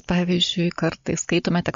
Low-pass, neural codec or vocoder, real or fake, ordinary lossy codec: 7.2 kHz; none; real; MP3, 48 kbps